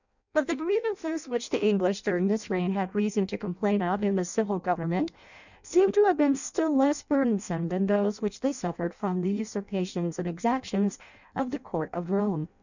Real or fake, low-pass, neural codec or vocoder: fake; 7.2 kHz; codec, 16 kHz in and 24 kHz out, 0.6 kbps, FireRedTTS-2 codec